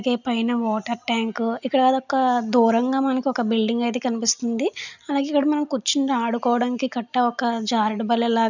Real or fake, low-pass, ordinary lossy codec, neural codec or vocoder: real; 7.2 kHz; none; none